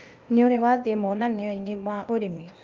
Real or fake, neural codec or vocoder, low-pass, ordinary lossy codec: fake; codec, 16 kHz, 0.8 kbps, ZipCodec; 7.2 kHz; Opus, 24 kbps